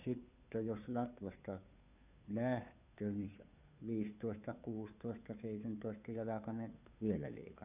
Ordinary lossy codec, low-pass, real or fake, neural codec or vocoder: none; 3.6 kHz; fake; codec, 16 kHz, 2 kbps, FunCodec, trained on Chinese and English, 25 frames a second